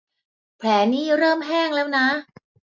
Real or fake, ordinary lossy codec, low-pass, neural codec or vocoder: real; MP3, 64 kbps; 7.2 kHz; none